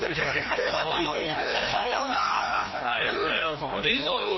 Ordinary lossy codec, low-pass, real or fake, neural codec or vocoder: MP3, 24 kbps; 7.2 kHz; fake; codec, 16 kHz, 1 kbps, FreqCodec, larger model